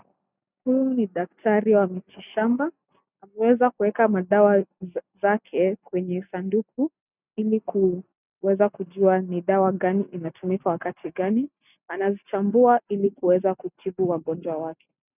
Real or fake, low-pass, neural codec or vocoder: real; 3.6 kHz; none